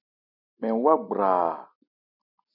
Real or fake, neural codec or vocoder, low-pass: real; none; 5.4 kHz